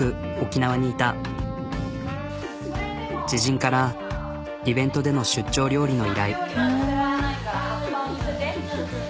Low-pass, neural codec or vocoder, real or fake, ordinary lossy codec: none; none; real; none